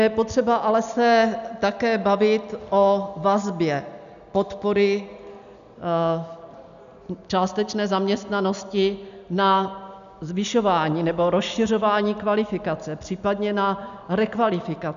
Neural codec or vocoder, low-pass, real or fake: none; 7.2 kHz; real